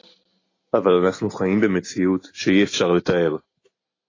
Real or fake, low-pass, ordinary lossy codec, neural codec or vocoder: real; 7.2 kHz; AAC, 32 kbps; none